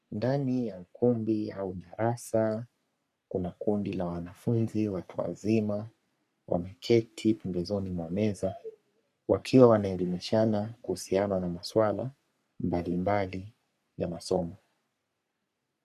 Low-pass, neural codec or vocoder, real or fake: 14.4 kHz; codec, 44.1 kHz, 3.4 kbps, Pupu-Codec; fake